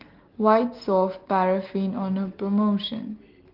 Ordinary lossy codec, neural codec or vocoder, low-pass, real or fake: Opus, 16 kbps; none; 5.4 kHz; real